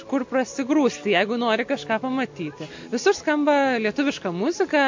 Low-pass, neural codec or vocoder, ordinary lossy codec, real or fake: 7.2 kHz; none; MP3, 48 kbps; real